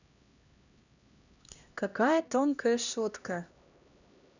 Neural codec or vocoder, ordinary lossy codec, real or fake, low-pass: codec, 16 kHz, 1 kbps, X-Codec, HuBERT features, trained on LibriSpeech; none; fake; 7.2 kHz